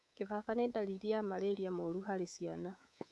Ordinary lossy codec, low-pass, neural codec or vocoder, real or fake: none; none; codec, 24 kHz, 3.1 kbps, DualCodec; fake